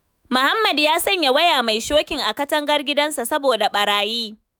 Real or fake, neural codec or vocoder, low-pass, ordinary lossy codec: fake; autoencoder, 48 kHz, 128 numbers a frame, DAC-VAE, trained on Japanese speech; none; none